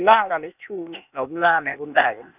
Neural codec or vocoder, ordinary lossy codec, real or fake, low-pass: codec, 16 kHz, 0.8 kbps, ZipCodec; none; fake; 3.6 kHz